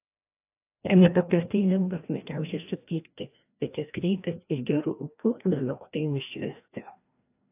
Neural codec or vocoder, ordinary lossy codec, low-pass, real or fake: codec, 16 kHz, 1 kbps, FreqCodec, larger model; AAC, 32 kbps; 3.6 kHz; fake